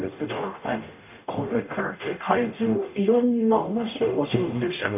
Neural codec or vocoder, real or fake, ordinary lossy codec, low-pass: codec, 44.1 kHz, 0.9 kbps, DAC; fake; none; 3.6 kHz